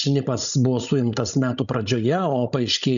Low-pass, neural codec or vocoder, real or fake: 7.2 kHz; codec, 16 kHz, 16 kbps, FreqCodec, larger model; fake